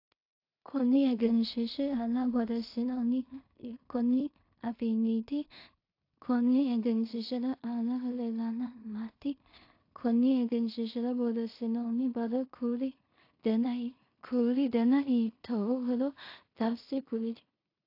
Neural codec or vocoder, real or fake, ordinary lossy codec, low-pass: codec, 16 kHz in and 24 kHz out, 0.4 kbps, LongCat-Audio-Codec, two codebook decoder; fake; AAC, 32 kbps; 5.4 kHz